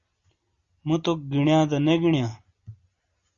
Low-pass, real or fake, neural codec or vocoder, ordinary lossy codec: 7.2 kHz; real; none; Opus, 64 kbps